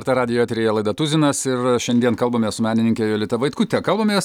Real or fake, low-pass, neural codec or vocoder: real; 19.8 kHz; none